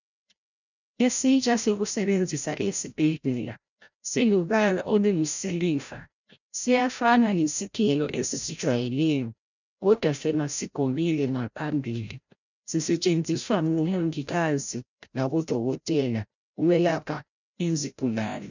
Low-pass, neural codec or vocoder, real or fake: 7.2 kHz; codec, 16 kHz, 0.5 kbps, FreqCodec, larger model; fake